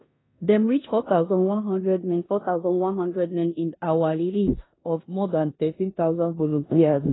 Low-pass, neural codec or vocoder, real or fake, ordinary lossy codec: 7.2 kHz; codec, 16 kHz in and 24 kHz out, 0.9 kbps, LongCat-Audio-Codec, four codebook decoder; fake; AAC, 16 kbps